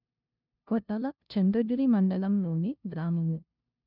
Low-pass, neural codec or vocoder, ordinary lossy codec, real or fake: 5.4 kHz; codec, 16 kHz, 0.5 kbps, FunCodec, trained on LibriTTS, 25 frames a second; none; fake